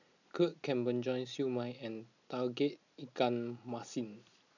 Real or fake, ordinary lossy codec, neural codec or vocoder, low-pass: real; none; none; 7.2 kHz